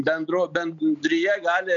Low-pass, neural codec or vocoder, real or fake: 7.2 kHz; none; real